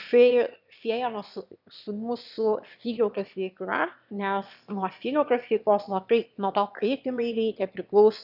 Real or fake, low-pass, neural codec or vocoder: fake; 5.4 kHz; autoencoder, 22.05 kHz, a latent of 192 numbers a frame, VITS, trained on one speaker